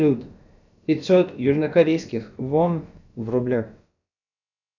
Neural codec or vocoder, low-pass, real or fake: codec, 16 kHz, about 1 kbps, DyCAST, with the encoder's durations; 7.2 kHz; fake